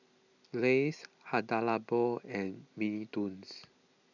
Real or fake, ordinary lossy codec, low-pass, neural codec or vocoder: real; none; 7.2 kHz; none